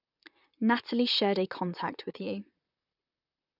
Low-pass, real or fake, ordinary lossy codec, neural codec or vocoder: 5.4 kHz; fake; none; vocoder, 44.1 kHz, 128 mel bands, Pupu-Vocoder